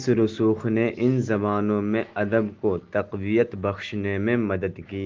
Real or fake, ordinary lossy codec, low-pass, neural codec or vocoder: real; Opus, 16 kbps; 7.2 kHz; none